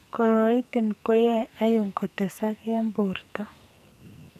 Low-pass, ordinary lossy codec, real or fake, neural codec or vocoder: 14.4 kHz; none; fake; codec, 44.1 kHz, 2.6 kbps, SNAC